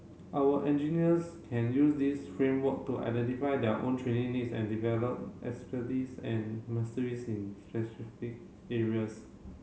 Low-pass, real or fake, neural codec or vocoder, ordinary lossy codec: none; real; none; none